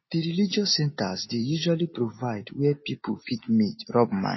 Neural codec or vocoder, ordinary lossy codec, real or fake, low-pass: none; MP3, 24 kbps; real; 7.2 kHz